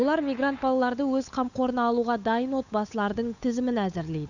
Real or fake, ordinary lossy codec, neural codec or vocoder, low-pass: fake; none; codec, 16 kHz, 8 kbps, FunCodec, trained on Chinese and English, 25 frames a second; 7.2 kHz